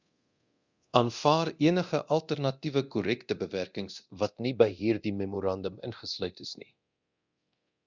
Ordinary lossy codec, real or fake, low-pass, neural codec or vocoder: Opus, 64 kbps; fake; 7.2 kHz; codec, 24 kHz, 0.9 kbps, DualCodec